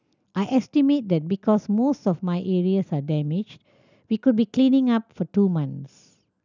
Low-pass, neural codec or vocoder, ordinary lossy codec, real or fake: 7.2 kHz; none; none; real